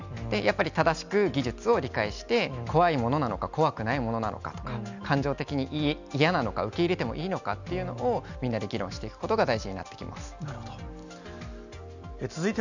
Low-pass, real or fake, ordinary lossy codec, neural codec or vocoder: 7.2 kHz; real; none; none